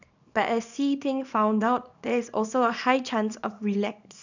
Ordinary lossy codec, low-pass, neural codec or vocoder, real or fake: none; 7.2 kHz; codec, 24 kHz, 0.9 kbps, WavTokenizer, small release; fake